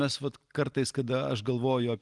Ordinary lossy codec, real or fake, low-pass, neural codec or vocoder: Opus, 24 kbps; real; 10.8 kHz; none